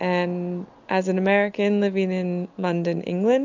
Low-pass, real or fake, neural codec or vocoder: 7.2 kHz; real; none